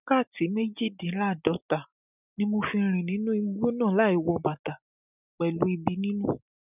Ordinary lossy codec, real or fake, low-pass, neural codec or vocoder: none; real; 3.6 kHz; none